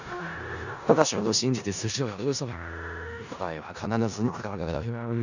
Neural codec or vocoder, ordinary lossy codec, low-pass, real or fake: codec, 16 kHz in and 24 kHz out, 0.4 kbps, LongCat-Audio-Codec, four codebook decoder; none; 7.2 kHz; fake